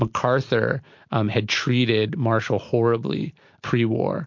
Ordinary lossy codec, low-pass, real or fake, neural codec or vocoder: MP3, 48 kbps; 7.2 kHz; real; none